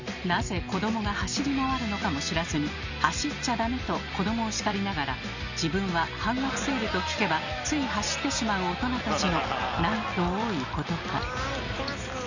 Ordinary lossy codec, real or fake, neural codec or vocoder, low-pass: none; real; none; 7.2 kHz